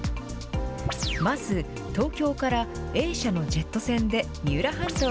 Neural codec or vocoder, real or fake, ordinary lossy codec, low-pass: none; real; none; none